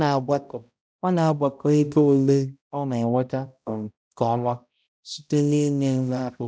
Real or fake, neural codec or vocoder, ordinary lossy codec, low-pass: fake; codec, 16 kHz, 0.5 kbps, X-Codec, HuBERT features, trained on balanced general audio; none; none